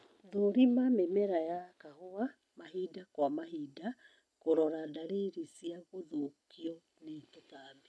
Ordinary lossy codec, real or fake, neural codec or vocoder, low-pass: none; real; none; none